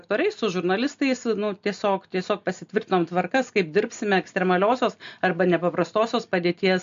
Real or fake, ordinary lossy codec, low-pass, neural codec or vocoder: real; MP3, 48 kbps; 7.2 kHz; none